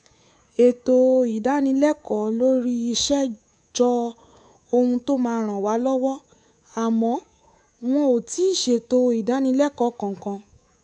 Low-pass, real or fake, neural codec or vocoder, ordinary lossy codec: 10.8 kHz; fake; codec, 24 kHz, 3.1 kbps, DualCodec; none